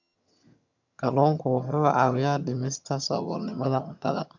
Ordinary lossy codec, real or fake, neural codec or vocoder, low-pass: none; fake; vocoder, 22.05 kHz, 80 mel bands, HiFi-GAN; 7.2 kHz